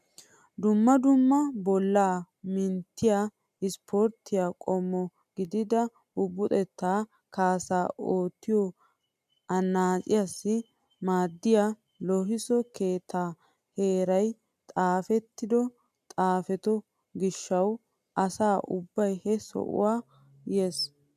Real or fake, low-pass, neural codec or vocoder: real; 14.4 kHz; none